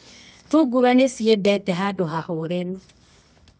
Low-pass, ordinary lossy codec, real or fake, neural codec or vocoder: 10.8 kHz; none; fake; codec, 24 kHz, 0.9 kbps, WavTokenizer, medium music audio release